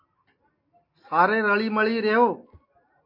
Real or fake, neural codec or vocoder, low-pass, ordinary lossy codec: real; none; 5.4 kHz; MP3, 32 kbps